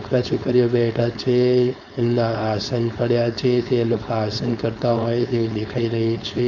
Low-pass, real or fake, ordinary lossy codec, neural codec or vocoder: 7.2 kHz; fake; none; codec, 16 kHz, 4.8 kbps, FACodec